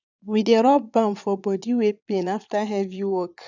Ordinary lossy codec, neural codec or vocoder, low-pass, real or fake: none; vocoder, 22.05 kHz, 80 mel bands, Vocos; 7.2 kHz; fake